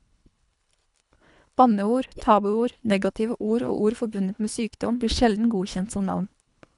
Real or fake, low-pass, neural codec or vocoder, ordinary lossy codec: fake; 10.8 kHz; codec, 24 kHz, 3 kbps, HILCodec; none